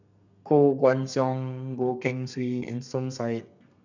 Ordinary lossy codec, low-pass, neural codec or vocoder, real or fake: none; 7.2 kHz; codec, 32 kHz, 1.9 kbps, SNAC; fake